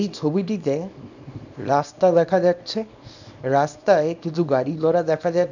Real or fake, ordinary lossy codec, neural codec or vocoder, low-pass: fake; none; codec, 24 kHz, 0.9 kbps, WavTokenizer, small release; 7.2 kHz